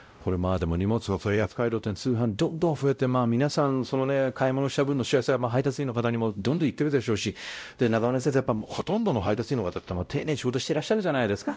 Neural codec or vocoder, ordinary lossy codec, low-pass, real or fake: codec, 16 kHz, 0.5 kbps, X-Codec, WavLM features, trained on Multilingual LibriSpeech; none; none; fake